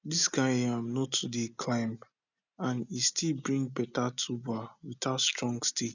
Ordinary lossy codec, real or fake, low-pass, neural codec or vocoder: none; real; 7.2 kHz; none